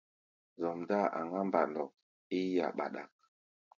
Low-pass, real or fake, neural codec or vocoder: 7.2 kHz; real; none